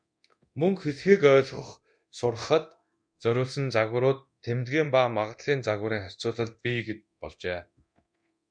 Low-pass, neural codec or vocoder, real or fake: 9.9 kHz; codec, 24 kHz, 0.9 kbps, DualCodec; fake